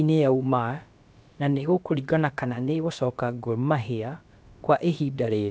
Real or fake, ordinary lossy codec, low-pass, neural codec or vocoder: fake; none; none; codec, 16 kHz, about 1 kbps, DyCAST, with the encoder's durations